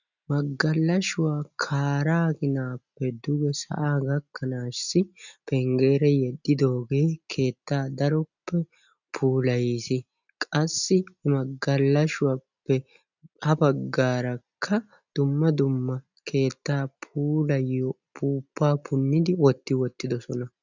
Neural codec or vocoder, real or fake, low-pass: none; real; 7.2 kHz